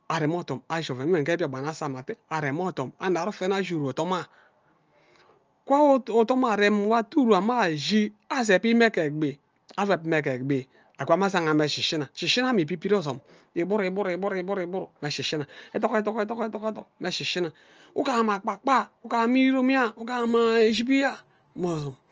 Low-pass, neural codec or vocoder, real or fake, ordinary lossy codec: 7.2 kHz; none; real; Opus, 24 kbps